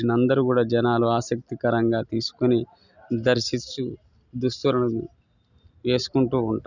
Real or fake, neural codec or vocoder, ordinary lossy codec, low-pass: real; none; none; 7.2 kHz